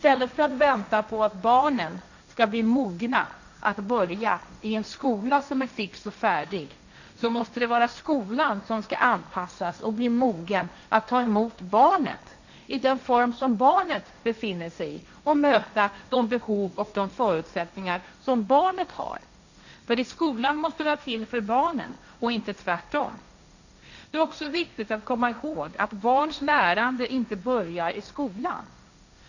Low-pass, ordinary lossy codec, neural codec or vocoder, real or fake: 7.2 kHz; none; codec, 16 kHz, 1.1 kbps, Voila-Tokenizer; fake